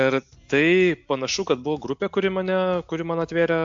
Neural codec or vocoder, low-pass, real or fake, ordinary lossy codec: none; 7.2 kHz; real; AAC, 64 kbps